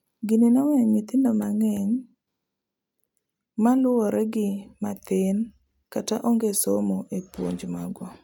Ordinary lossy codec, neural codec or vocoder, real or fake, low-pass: none; none; real; 19.8 kHz